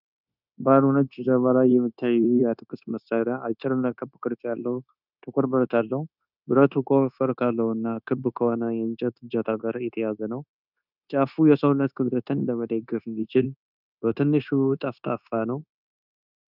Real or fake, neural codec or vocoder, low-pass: fake; codec, 16 kHz, 0.9 kbps, LongCat-Audio-Codec; 5.4 kHz